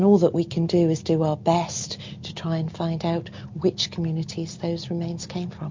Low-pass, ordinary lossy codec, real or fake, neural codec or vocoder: 7.2 kHz; MP3, 48 kbps; real; none